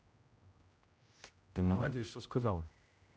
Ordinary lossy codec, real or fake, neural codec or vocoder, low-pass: none; fake; codec, 16 kHz, 0.5 kbps, X-Codec, HuBERT features, trained on general audio; none